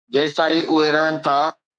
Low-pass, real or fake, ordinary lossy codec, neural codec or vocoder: 9.9 kHz; fake; MP3, 96 kbps; codec, 32 kHz, 1.9 kbps, SNAC